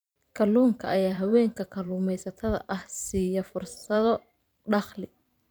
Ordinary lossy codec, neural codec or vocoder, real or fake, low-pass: none; vocoder, 44.1 kHz, 128 mel bands every 512 samples, BigVGAN v2; fake; none